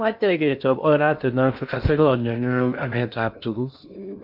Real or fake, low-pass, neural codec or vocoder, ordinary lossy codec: fake; 5.4 kHz; codec, 16 kHz in and 24 kHz out, 0.6 kbps, FocalCodec, streaming, 2048 codes; none